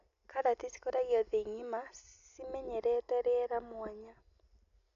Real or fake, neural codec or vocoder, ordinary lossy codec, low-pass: real; none; none; 7.2 kHz